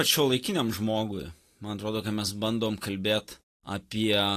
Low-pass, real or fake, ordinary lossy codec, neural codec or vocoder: 14.4 kHz; real; AAC, 48 kbps; none